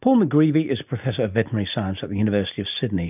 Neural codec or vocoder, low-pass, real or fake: none; 3.6 kHz; real